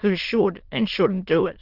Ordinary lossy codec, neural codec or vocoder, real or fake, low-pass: Opus, 16 kbps; autoencoder, 22.05 kHz, a latent of 192 numbers a frame, VITS, trained on many speakers; fake; 5.4 kHz